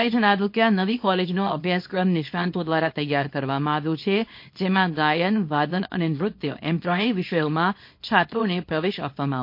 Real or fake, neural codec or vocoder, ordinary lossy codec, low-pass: fake; codec, 24 kHz, 0.9 kbps, WavTokenizer, small release; MP3, 32 kbps; 5.4 kHz